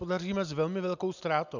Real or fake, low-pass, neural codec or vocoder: real; 7.2 kHz; none